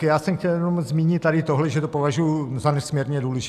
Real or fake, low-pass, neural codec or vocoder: real; 14.4 kHz; none